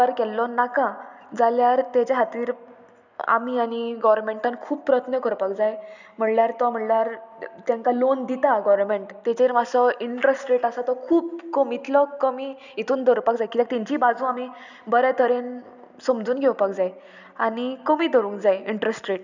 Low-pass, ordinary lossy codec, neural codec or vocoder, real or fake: 7.2 kHz; none; none; real